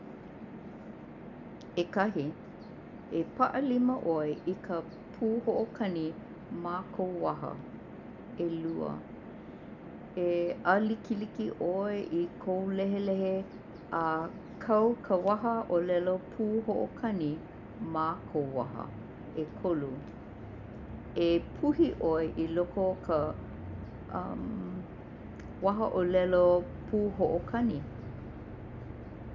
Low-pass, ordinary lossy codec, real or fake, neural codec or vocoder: 7.2 kHz; none; real; none